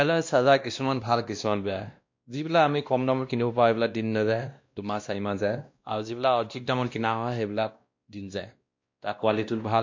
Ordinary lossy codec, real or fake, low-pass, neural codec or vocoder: MP3, 48 kbps; fake; 7.2 kHz; codec, 16 kHz, 1 kbps, X-Codec, WavLM features, trained on Multilingual LibriSpeech